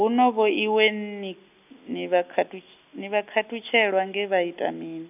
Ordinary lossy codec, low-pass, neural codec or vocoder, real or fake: none; 3.6 kHz; none; real